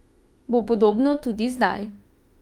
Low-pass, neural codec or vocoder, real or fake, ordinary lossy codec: 19.8 kHz; autoencoder, 48 kHz, 32 numbers a frame, DAC-VAE, trained on Japanese speech; fake; Opus, 32 kbps